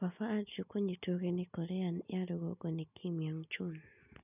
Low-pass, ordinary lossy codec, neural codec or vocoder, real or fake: 3.6 kHz; none; none; real